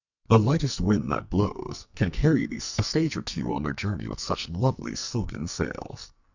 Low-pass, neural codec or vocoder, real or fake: 7.2 kHz; codec, 44.1 kHz, 2.6 kbps, SNAC; fake